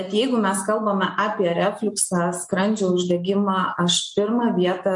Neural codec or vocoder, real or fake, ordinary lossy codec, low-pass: none; real; MP3, 48 kbps; 10.8 kHz